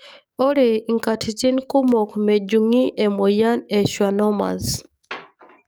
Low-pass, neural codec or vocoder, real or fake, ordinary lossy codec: none; codec, 44.1 kHz, 7.8 kbps, DAC; fake; none